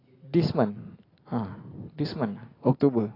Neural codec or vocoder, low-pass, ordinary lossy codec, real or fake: none; 5.4 kHz; AAC, 24 kbps; real